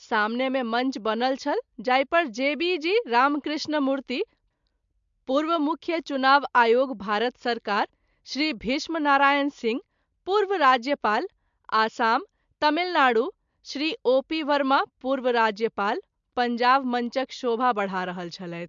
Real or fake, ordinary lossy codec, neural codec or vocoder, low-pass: real; MP3, 64 kbps; none; 7.2 kHz